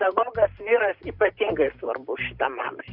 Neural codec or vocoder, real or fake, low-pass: vocoder, 44.1 kHz, 128 mel bands every 512 samples, BigVGAN v2; fake; 5.4 kHz